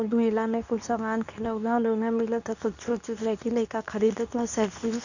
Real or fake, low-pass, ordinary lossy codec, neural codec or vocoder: fake; 7.2 kHz; none; codec, 24 kHz, 0.9 kbps, WavTokenizer, medium speech release version 2